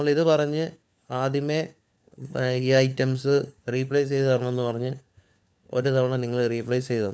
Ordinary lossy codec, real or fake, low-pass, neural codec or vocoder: none; fake; none; codec, 16 kHz, 4 kbps, FunCodec, trained on LibriTTS, 50 frames a second